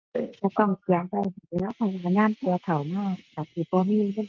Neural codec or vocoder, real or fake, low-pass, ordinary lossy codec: none; real; 7.2 kHz; Opus, 32 kbps